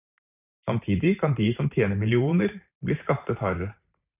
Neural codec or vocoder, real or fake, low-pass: vocoder, 44.1 kHz, 128 mel bands every 512 samples, BigVGAN v2; fake; 3.6 kHz